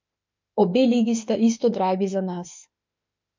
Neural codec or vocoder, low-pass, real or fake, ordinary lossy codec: autoencoder, 48 kHz, 32 numbers a frame, DAC-VAE, trained on Japanese speech; 7.2 kHz; fake; MP3, 48 kbps